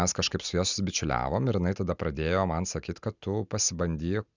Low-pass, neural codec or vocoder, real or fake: 7.2 kHz; none; real